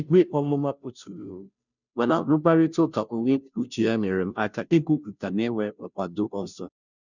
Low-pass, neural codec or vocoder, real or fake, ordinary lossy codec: 7.2 kHz; codec, 16 kHz, 0.5 kbps, FunCodec, trained on Chinese and English, 25 frames a second; fake; none